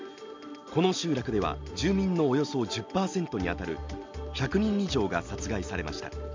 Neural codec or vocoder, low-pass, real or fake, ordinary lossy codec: none; 7.2 kHz; real; MP3, 64 kbps